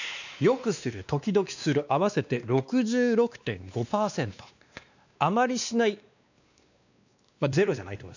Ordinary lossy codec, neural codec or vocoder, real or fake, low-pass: none; codec, 16 kHz, 2 kbps, X-Codec, WavLM features, trained on Multilingual LibriSpeech; fake; 7.2 kHz